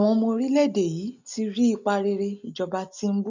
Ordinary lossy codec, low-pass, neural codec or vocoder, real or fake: none; 7.2 kHz; none; real